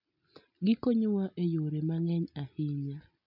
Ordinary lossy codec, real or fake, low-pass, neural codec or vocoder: none; real; 5.4 kHz; none